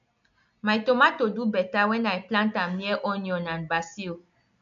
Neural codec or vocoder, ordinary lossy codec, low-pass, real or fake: none; none; 7.2 kHz; real